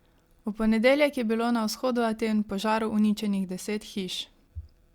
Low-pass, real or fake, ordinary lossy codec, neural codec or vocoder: 19.8 kHz; real; Opus, 64 kbps; none